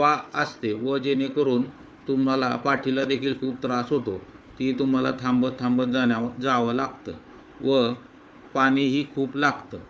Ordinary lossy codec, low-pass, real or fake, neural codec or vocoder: none; none; fake; codec, 16 kHz, 4 kbps, FunCodec, trained on Chinese and English, 50 frames a second